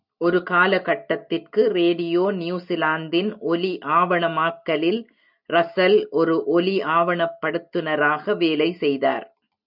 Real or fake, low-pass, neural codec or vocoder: real; 5.4 kHz; none